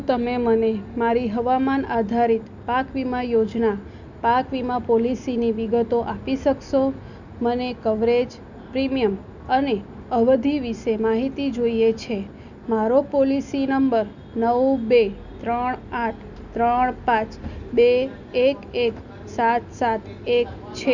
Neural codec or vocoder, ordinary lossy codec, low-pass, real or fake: none; none; 7.2 kHz; real